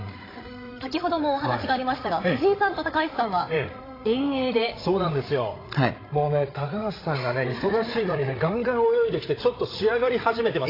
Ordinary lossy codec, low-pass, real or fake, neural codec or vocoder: AAC, 24 kbps; 5.4 kHz; fake; codec, 16 kHz, 8 kbps, FreqCodec, larger model